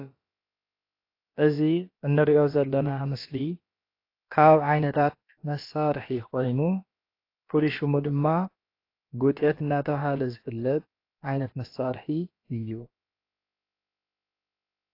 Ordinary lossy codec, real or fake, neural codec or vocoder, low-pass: AAC, 32 kbps; fake; codec, 16 kHz, about 1 kbps, DyCAST, with the encoder's durations; 5.4 kHz